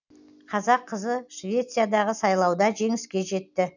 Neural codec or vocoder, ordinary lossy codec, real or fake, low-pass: none; none; real; 7.2 kHz